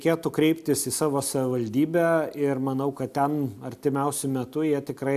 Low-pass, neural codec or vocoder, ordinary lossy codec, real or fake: 14.4 kHz; none; AAC, 96 kbps; real